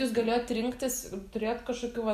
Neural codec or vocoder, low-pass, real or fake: none; 14.4 kHz; real